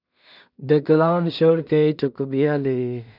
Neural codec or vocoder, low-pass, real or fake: codec, 16 kHz in and 24 kHz out, 0.4 kbps, LongCat-Audio-Codec, two codebook decoder; 5.4 kHz; fake